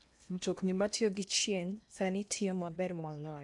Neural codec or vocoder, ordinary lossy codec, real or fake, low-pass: codec, 16 kHz in and 24 kHz out, 0.8 kbps, FocalCodec, streaming, 65536 codes; none; fake; 10.8 kHz